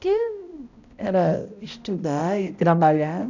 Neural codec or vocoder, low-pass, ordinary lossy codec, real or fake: codec, 16 kHz, 0.5 kbps, X-Codec, HuBERT features, trained on balanced general audio; 7.2 kHz; none; fake